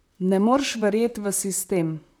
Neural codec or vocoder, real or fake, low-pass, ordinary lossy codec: vocoder, 44.1 kHz, 128 mel bands, Pupu-Vocoder; fake; none; none